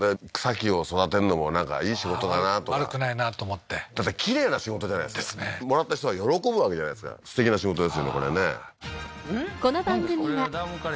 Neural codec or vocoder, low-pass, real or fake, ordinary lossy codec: none; none; real; none